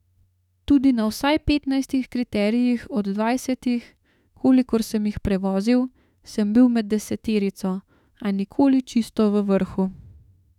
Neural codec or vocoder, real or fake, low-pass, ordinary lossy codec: autoencoder, 48 kHz, 32 numbers a frame, DAC-VAE, trained on Japanese speech; fake; 19.8 kHz; none